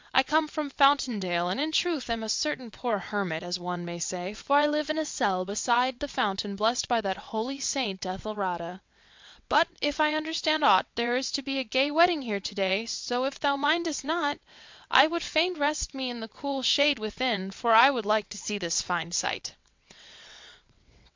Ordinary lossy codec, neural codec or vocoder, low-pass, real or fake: MP3, 64 kbps; vocoder, 22.05 kHz, 80 mel bands, WaveNeXt; 7.2 kHz; fake